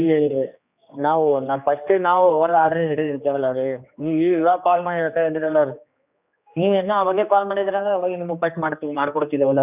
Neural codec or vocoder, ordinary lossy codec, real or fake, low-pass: codec, 16 kHz, 2 kbps, X-Codec, HuBERT features, trained on general audio; none; fake; 3.6 kHz